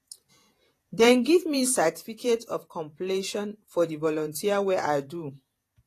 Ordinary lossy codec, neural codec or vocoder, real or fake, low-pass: AAC, 48 kbps; vocoder, 48 kHz, 128 mel bands, Vocos; fake; 14.4 kHz